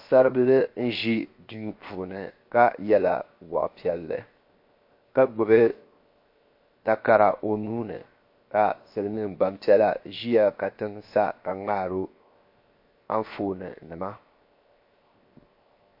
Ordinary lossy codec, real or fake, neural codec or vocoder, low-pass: MP3, 32 kbps; fake; codec, 16 kHz, 0.7 kbps, FocalCodec; 5.4 kHz